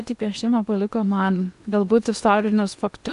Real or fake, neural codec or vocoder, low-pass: fake; codec, 16 kHz in and 24 kHz out, 0.8 kbps, FocalCodec, streaming, 65536 codes; 10.8 kHz